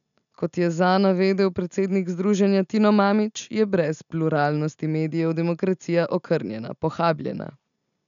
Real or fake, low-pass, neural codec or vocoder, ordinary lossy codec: real; 7.2 kHz; none; none